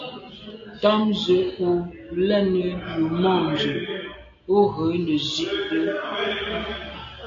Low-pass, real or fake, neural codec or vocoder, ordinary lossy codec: 7.2 kHz; real; none; AAC, 48 kbps